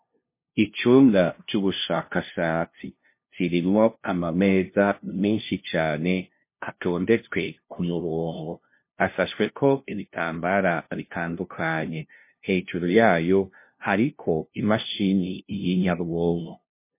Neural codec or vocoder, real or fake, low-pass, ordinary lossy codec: codec, 16 kHz, 0.5 kbps, FunCodec, trained on LibriTTS, 25 frames a second; fake; 3.6 kHz; MP3, 24 kbps